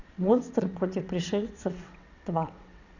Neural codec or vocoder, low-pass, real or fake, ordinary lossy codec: vocoder, 22.05 kHz, 80 mel bands, WaveNeXt; 7.2 kHz; fake; none